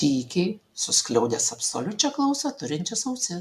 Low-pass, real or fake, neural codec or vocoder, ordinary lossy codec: 14.4 kHz; real; none; MP3, 96 kbps